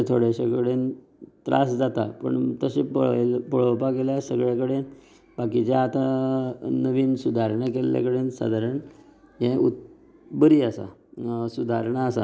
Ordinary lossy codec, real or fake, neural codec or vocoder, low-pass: none; real; none; none